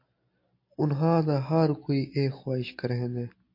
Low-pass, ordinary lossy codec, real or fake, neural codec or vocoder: 5.4 kHz; MP3, 32 kbps; real; none